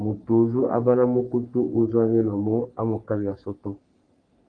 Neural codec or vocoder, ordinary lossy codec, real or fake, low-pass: codec, 44.1 kHz, 3.4 kbps, Pupu-Codec; Opus, 32 kbps; fake; 9.9 kHz